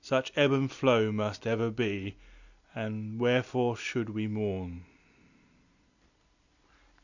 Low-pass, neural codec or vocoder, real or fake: 7.2 kHz; none; real